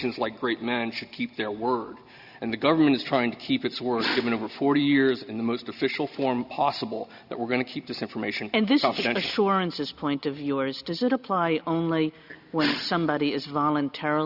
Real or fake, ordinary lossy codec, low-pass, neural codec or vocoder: real; Opus, 64 kbps; 5.4 kHz; none